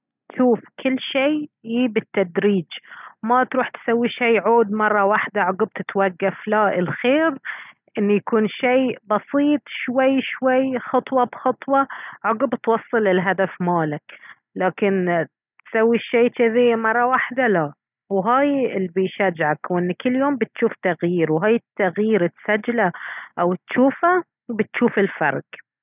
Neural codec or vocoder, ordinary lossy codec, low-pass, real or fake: none; none; 3.6 kHz; real